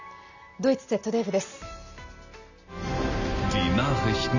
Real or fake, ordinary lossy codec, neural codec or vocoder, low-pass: real; none; none; 7.2 kHz